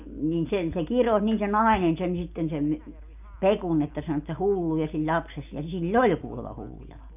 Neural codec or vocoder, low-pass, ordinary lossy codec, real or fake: none; 3.6 kHz; none; real